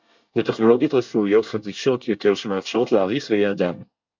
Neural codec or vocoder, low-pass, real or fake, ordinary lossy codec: codec, 24 kHz, 1 kbps, SNAC; 7.2 kHz; fake; MP3, 64 kbps